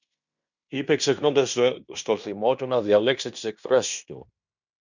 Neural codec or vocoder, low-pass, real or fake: codec, 16 kHz in and 24 kHz out, 0.9 kbps, LongCat-Audio-Codec, fine tuned four codebook decoder; 7.2 kHz; fake